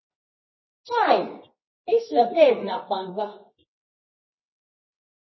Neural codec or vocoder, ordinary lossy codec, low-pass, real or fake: codec, 24 kHz, 0.9 kbps, WavTokenizer, medium music audio release; MP3, 24 kbps; 7.2 kHz; fake